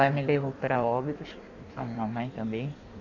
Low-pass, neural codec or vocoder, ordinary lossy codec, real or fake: 7.2 kHz; codec, 16 kHz in and 24 kHz out, 1.1 kbps, FireRedTTS-2 codec; none; fake